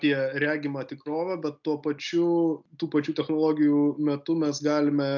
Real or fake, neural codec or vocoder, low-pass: real; none; 7.2 kHz